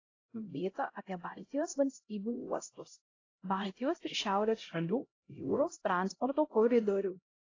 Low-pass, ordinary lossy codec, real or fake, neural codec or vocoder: 7.2 kHz; AAC, 32 kbps; fake; codec, 16 kHz, 0.5 kbps, X-Codec, HuBERT features, trained on LibriSpeech